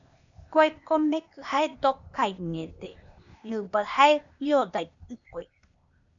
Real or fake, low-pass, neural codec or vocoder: fake; 7.2 kHz; codec, 16 kHz, 0.8 kbps, ZipCodec